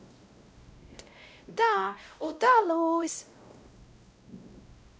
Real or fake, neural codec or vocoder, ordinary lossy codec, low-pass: fake; codec, 16 kHz, 0.5 kbps, X-Codec, WavLM features, trained on Multilingual LibriSpeech; none; none